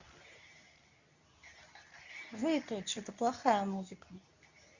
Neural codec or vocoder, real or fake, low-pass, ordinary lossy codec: codec, 24 kHz, 0.9 kbps, WavTokenizer, medium speech release version 2; fake; 7.2 kHz; Opus, 64 kbps